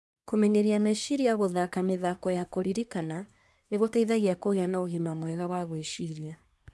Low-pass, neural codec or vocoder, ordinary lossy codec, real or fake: none; codec, 24 kHz, 1 kbps, SNAC; none; fake